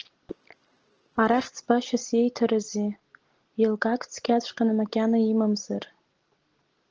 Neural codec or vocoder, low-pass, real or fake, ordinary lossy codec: none; 7.2 kHz; real; Opus, 16 kbps